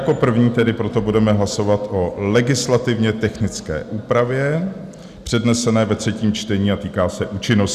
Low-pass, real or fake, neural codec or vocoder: 14.4 kHz; real; none